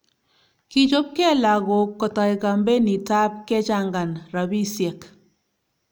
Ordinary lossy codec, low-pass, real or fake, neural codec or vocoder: none; none; real; none